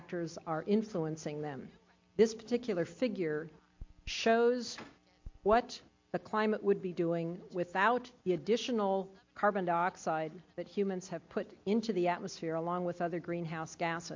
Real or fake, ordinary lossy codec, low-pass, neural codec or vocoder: real; MP3, 48 kbps; 7.2 kHz; none